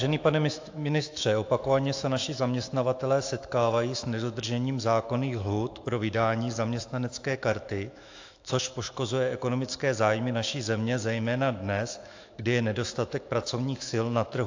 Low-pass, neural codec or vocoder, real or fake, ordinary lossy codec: 7.2 kHz; autoencoder, 48 kHz, 128 numbers a frame, DAC-VAE, trained on Japanese speech; fake; AAC, 48 kbps